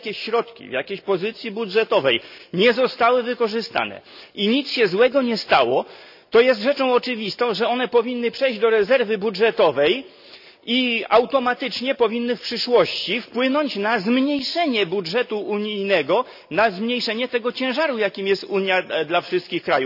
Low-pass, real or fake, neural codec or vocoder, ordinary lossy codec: 5.4 kHz; real; none; none